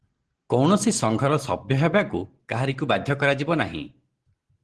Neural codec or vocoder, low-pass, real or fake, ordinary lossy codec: none; 10.8 kHz; real; Opus, 16 kbps